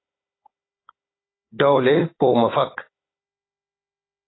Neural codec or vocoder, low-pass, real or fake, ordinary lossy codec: codec, 16 kHz, 16 kbps, FunCodec, trained on Chinese and English, 50 frames a second; 7.2 kHz; fake; AAC, 16 kbps